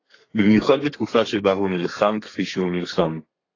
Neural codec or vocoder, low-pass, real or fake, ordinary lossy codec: codec, 32 kHz, 1.9 kbps, SNAC; 7.2 kHz; fake; AAC, 32 kbps